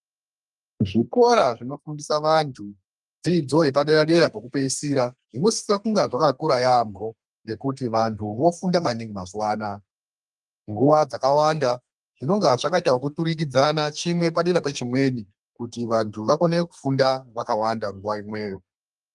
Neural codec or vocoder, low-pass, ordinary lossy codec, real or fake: codec, 32 kHz, 1.9 kbps, SNAC; 10.8 kHz; Opus, 32 kbps; fake